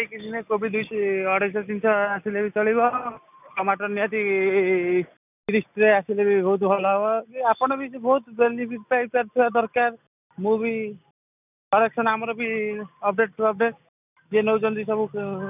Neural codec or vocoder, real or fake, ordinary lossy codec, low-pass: none; real; none; 3.6 kHz